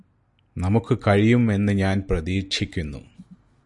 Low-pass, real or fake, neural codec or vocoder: 10.8 kHz; real; none